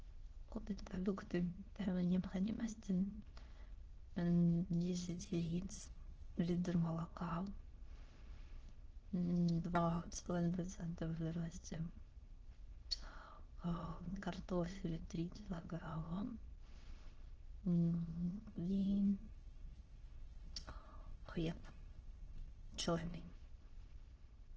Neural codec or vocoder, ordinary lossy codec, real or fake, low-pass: autoencoder, 22.05 kHz, a latent of 192 numbers a frame, VITS, trained on many speakers; Opus, 16 kbps; fake; 7.2 kHz